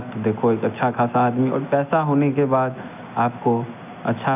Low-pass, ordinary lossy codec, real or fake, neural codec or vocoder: 3.6 kHz; AAC, 32 kbps; real; none